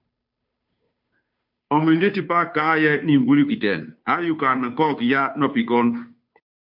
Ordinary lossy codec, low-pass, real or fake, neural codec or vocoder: MP3, 48 kbps; 5.4 kHz; fake; codec, 16 kHz, 2 kbps, FunCodec, trained on Chinese and English, 25 frames a second